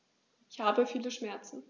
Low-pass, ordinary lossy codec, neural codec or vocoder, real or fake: none; none; none; real